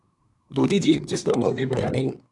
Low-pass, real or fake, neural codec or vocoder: 10.8 kHz; fake; codec, 24 kHz, 1 kbps, SNAC